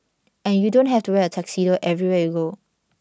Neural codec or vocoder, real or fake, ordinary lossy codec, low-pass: none; real; none; none